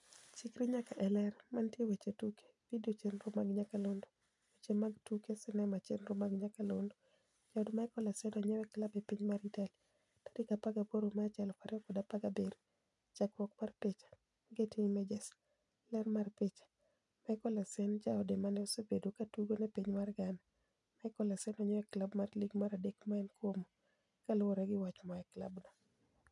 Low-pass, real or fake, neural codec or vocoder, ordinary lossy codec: 10.8 kHz; real; none; none